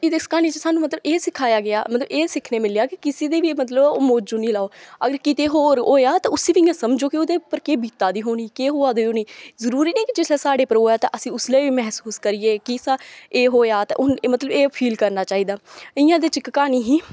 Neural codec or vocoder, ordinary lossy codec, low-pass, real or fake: none; none; none; real